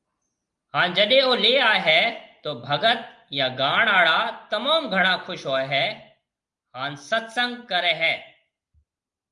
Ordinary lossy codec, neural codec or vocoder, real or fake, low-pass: Opus, 32 kbps; none; real; 10.8 kHz